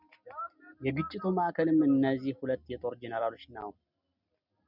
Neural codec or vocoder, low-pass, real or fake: none; 5.4 kHz; real